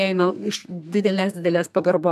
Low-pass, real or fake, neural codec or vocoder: 14.4 kHz; fake; codec, 32 kHz, 1.9 kbps, SNAC